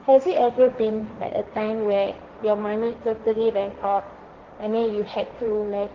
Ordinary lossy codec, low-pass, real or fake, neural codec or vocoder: Opus, 16 kbps; 7.2 kHz; fake; codec, 16 kHz, 1.1 kbps, Voila-Tokenizer